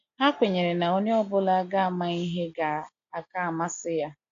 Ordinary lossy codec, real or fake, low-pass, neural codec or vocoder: AAC, 48 kbps; real; 7.2 kHz; none